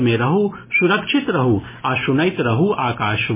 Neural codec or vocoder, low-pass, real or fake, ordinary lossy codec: none; 3.6 kHz; real; MP3, 16 kbps